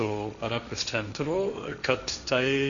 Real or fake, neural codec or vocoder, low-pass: fake; codec, 16 kHz, 1.1 kbps, Voila-Tokenizer; 7.2 kHz